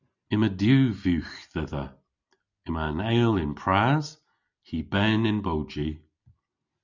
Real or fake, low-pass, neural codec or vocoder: real; 7.2 kHz; none